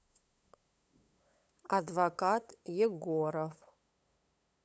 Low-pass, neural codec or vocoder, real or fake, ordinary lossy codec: none; codec, 16 kHz, 8 kbps, FunCodec, trained on LibriTTS, 25 frames a second; fake; none